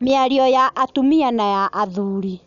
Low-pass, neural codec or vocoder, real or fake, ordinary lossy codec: 7.2 kHz; none; real; none